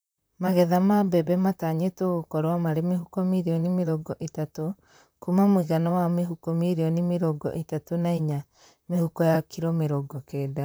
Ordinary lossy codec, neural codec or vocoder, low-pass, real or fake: none; vocoder, 44.1 kHz, 128 mel bands, Pupu-Vocoder; none; fake